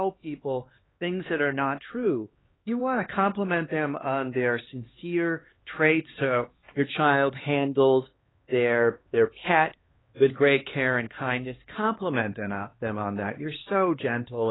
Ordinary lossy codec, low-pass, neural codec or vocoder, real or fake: AAC, 16 kbps; 7.2 kHz; codec, 16 kHz, 2 kbps, X-Codec, HuBERT features, trained on LibriSpeech; fake